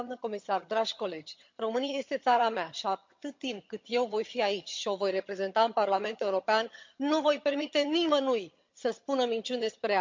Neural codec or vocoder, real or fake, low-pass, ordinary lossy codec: vocoder, 22.05 kHz, 80 mel bands, HiFi-GAN; fake; 7.2 kHz; MP3, 48 kbps